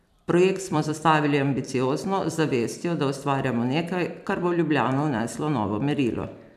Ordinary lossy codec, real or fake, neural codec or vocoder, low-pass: none; fake; vocoder, 44.1 kHz, 128 mel bands every 256 samples, BigVGAN v2; 14.4 kHz